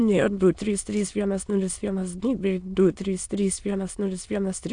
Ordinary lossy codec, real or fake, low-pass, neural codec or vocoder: AAC, 64 kbps; fake; 9.9 kHz; autoencoder, 22.05 kHz, a latent of 192 numbers a frame, VITS, trained on many speakers